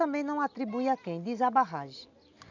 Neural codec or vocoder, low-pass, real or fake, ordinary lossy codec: none; 7.2 kHz; real; none